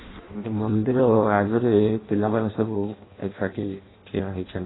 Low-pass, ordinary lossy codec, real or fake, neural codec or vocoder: 7.2 kHz; AAC, 16 kbps; fake; codec, 16 kHz in and 24 kHz out, 0.6 kbps, FireRedTTS-2 codec